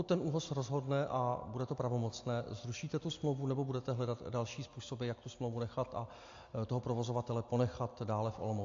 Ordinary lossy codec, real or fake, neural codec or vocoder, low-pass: AAC, 48 kbps; real; none; 7.2 kHz